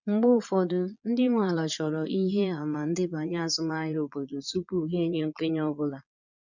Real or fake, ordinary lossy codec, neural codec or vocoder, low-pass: fake; none; vocoder, 22.05 kHz, 80 mel bands, Vocos; 7.2 kHz